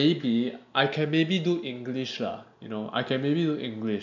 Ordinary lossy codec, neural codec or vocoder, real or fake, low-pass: MP3, 64 kbps; none; real; 7.2 kHz